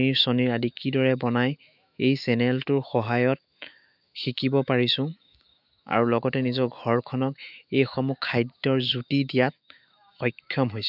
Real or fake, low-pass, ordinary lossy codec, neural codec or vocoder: fake; 5.4 kHz; none; autoencoder, 48 kHz, 128 numbers a frame, DAC-VAE, trained on Japanese speech